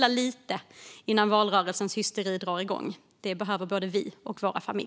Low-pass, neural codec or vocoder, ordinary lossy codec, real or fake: none; none; none; real